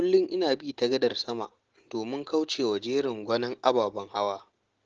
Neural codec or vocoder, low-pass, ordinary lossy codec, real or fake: none; 7.2 kHz; Opus, 16 kbps; real